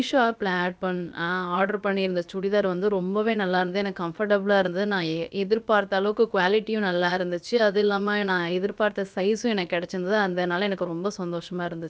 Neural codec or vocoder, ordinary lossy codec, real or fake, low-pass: codec, 16 kHz, about 1 kbps, DyCAST, with the encoder's durations; none; fake; none